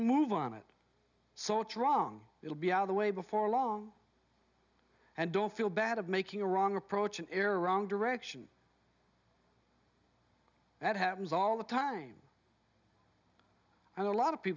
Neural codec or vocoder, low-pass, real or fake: none; 7.2 kHz; real